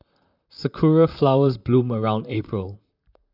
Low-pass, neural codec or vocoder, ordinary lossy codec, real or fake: 5.4 kHz; vocoder, 44.1 kHz, 128 mel bands, Pupu-Vocoder; none; fake